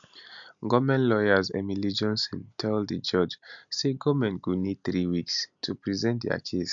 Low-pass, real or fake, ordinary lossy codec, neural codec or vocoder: 7.2 kHz; real; none; none